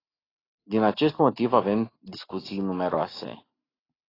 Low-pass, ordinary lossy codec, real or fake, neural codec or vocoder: 5.4 kHz; AAC, 24 kbps; real; none